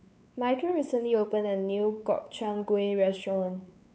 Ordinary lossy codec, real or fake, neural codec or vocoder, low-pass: none; fake; codec, 16 kHz, 4 kbps, X-Codec, WavLM features, trained on Multilingual LibriSpeech; none